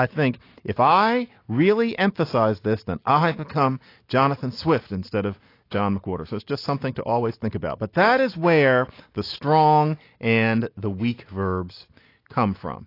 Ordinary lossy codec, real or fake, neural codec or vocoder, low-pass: AAC, 32 kbps; real; none; 5.4 kHz